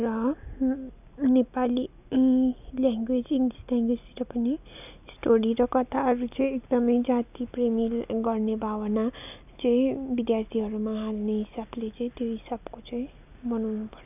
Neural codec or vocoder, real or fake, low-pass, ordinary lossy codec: none; real; 3.6 kHz; none